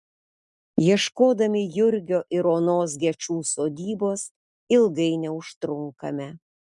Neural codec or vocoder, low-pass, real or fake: none; 10.8 kHz; real